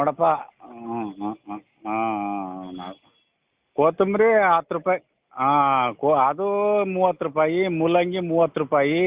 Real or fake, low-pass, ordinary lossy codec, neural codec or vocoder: real; 3.6 kHz; Opus, 32 kbps; none